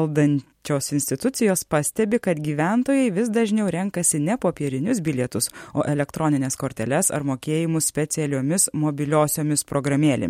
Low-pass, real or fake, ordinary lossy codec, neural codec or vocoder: 19.8 kHz; real; MP3, 64 kbps; none